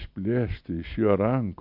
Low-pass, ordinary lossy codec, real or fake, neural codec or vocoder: 5.4 kHz; MP3, 48 kbps; real; none